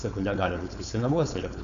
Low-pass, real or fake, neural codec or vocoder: 7.2 kHz; fake; codec, 16 kHz, 4.8 kbps, FACodec